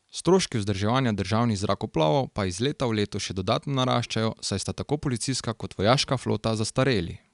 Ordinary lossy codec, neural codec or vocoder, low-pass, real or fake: none; none; 10.8 kHz; real